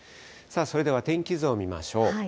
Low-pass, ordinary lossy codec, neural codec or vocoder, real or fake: none; none; none; real